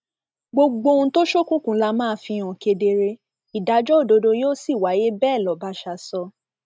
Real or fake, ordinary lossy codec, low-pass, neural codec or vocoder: real; none; none; none